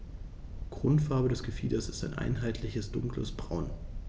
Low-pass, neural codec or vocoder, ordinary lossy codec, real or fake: none; none; none; real